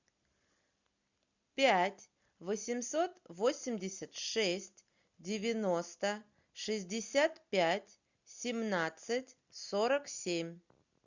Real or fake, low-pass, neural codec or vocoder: real; 7.2 kHz; none